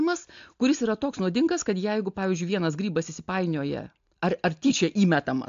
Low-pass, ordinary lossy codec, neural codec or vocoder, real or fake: 7.2 kHz; AAC, 64 kbps; none; real